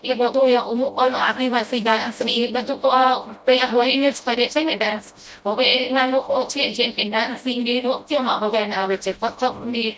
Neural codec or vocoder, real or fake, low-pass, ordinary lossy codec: codec, 16 kHz, 0.5 kbps, FreqCodec, smaller model; fake; none; none